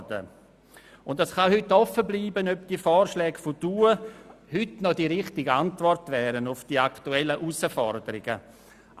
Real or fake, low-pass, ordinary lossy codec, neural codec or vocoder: real; 14.4 kHz; Opus, 64 kbps; none